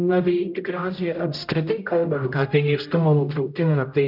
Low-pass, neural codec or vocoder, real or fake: 5.4 kHz; codec, 16 kHz, 0.5 kbps, X-Codec, HuBERT features, trained on general audio; fake